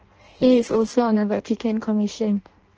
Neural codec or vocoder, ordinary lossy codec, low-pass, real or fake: codec, 16 kHz in and 24 kHz out, 0.6 kbps, FireRedTTS-2 codec; Opus, 16 kbps; 7.2 kHz; fake